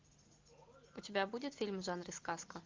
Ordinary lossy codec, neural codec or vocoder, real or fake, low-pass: Opus, 32 kbps; none; real; 7.2 kHz